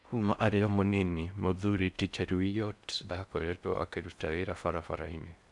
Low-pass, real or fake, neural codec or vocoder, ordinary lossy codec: 10.8 kHz; fake; codec, 16 kHz in and 24 kHz out, 0.6 kbps, FocalCodec, streaming, 4096 codes; none